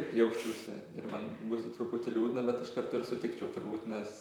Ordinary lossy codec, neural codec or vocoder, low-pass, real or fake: MP3, 96 kbps; vocoder, 44.1 kHz, 128 mel bands, Pupu-Vocoder; 19.8 kHz; fake